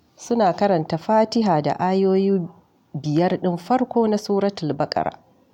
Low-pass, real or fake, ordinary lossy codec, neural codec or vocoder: 19.8 kHz; real; none; none